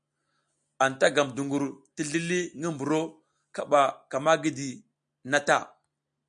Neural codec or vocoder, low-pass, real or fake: none; 9.9 kHz; real